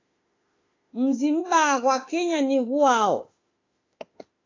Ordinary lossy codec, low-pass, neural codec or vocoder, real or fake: AAC, 32 kbps; 7.2 kHz; autoencoder, 48 kHz, 32 numbers a frame, DAC-VAE, trained on Japanese speech; fake